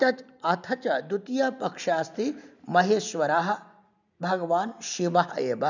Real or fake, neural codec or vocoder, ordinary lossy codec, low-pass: real; none; none; 7.2 kHz